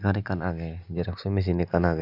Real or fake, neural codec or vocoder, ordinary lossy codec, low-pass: real; none; none; 5.4 kHz